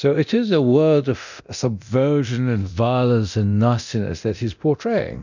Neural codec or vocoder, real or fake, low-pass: codec, 24 kHz, 0.9 kbps, DualCodec; fake; 7.2 kHz